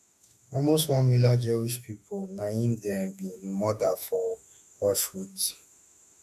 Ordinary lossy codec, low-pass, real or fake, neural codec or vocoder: none; 14.4 kHz; fake; autoencoder, 48 kHz, 32 numbers a frame, DAC-VAE, trained on Japanese speech